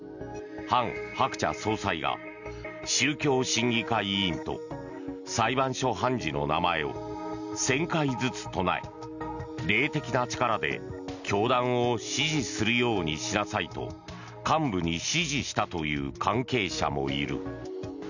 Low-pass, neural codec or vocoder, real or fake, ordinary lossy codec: 7.2 kHz; none; real; none